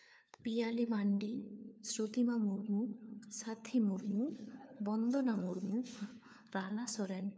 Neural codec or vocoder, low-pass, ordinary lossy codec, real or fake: codec, 16 kHz, 4 kbps, FunCodec, trained on LibriTTS, 50 frames a second; none; none; fake